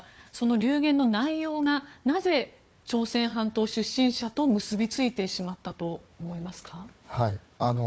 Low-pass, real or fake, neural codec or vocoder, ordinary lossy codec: none; fake; codec, 16 kHz, 4 kbps, FunCodec, trained on Chinese and English, 50 frames a second; none